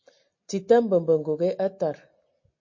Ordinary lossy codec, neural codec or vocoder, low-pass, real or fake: MP3, 32 kbps; none; 7.2 kHz; real